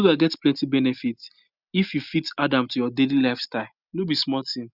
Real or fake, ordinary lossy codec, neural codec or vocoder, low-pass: real; Opus, 64 kbps; none; 5.4 kHz